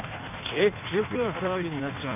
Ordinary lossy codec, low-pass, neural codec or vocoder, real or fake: none; 3.6 kHz; codec, 16 kHz in and 24 kHz out, 1.1 kbps, FireRedTTS-2 codec; fake